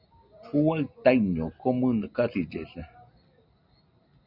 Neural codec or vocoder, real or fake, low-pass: none; real; 5.4 kHz